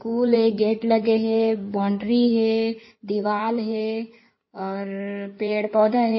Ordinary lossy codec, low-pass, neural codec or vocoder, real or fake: MP3, 24 kbps; 7.2 kHz; codec, 16 kHz in and 24 kHz out, 2.2 kbps, FireRedTTS-2 codec; fake